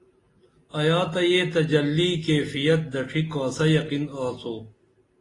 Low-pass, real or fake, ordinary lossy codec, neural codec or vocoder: 10.8 kHz; real; AAC, 32 kbps; none